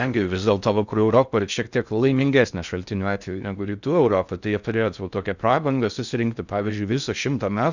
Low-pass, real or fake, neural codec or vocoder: 7.2 kHz; fake; codec, 16 kHz in and 24 kHz out, 0.6 kbps, FocalCodec, streaming, 4096 codes